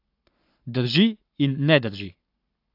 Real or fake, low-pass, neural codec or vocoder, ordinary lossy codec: fake; 5.4 kHz; codec, 44.1 kHz, 7.8 kbps, Pupu-Codec; AAC, 32 kbps